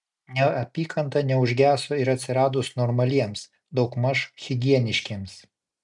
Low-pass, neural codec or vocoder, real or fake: 10.8 kHz; none; real